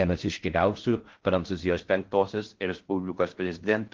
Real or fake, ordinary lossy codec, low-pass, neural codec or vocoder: fake; Opus, 16 kbps; 7.2 kHz; codec, 16 kHz in and 24 kHz out, 0.6 kbps, FocalCodec, streaming, 4096 codes